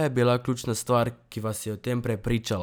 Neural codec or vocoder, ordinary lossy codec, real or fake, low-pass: none; none; real; none